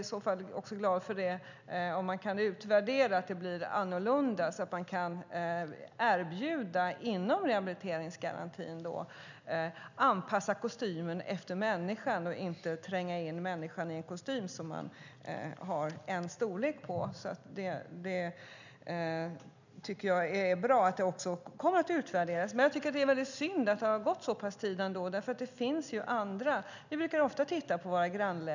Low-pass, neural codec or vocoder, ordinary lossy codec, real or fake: 7.2 kHz; none; none; real